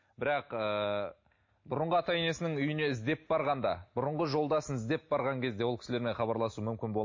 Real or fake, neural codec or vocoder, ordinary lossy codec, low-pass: real; none; MP3, 32 kbps; 7.2 kHz